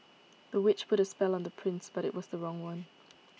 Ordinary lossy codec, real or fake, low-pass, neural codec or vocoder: none; real; none; none